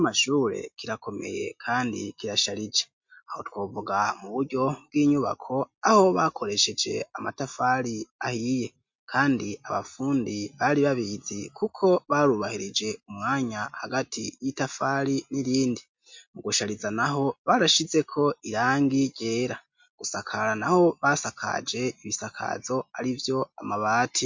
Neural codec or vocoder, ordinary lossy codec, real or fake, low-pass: none; MP3, 48 kbps; real; 7.2 kHz